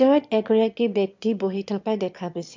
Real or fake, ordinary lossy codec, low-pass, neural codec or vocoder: fake; MP3, 64 kbps; 7.2 kHz; autoencoder, 22.05 kHz, a latent of 192 numbers a frame, VITS, trained on one speaker